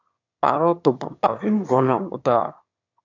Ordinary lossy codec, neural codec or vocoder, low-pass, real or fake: AAC, 48 kbps; autoencoder, 22.05 kHz, a latent of 192 numbers a frame, VITS, trained on one speaker; 7.2 kHz; fake